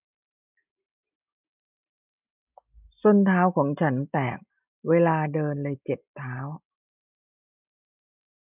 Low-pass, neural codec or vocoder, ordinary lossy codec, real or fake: 3.6 kHz; none; none; real